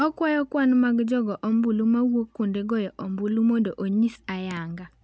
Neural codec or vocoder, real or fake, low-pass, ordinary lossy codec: none; real; none; none